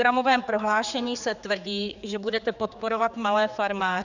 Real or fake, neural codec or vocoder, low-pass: fake; codec, 16 kHz, 4 kbps, X-Codec, HuBERT features, trained on general audio; 7.2 kHz